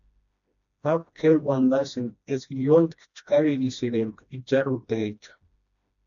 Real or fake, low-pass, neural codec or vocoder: fake; 7.2 kHz; codec, 16 kHz, 1 kbps, FreqCodec, smaller model